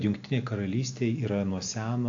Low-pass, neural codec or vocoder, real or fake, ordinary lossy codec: 7.2 kHz; none; real; AAC, 48 kbps